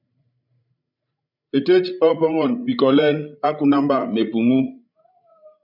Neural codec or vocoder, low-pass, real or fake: codec, 16 kHz, 16 kbps, FreqCodec, larger model; 5.4 kHz; fake